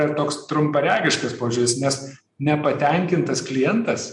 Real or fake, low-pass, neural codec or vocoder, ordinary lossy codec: real; 10.8 kHz; none; MP3, 64 kbps